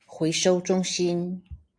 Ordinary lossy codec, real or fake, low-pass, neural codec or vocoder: AAC, 64 kbps; real; 9.9 kHz; none